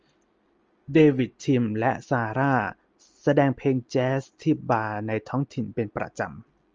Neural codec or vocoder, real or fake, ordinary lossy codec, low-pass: none; real; Opus, 24 kbps; 7.2 kHz